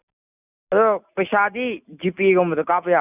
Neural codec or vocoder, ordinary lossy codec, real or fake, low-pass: none; none; real; 3.6 kHz